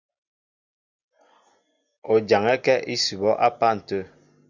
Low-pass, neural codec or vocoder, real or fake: 7.2 kHz; none; real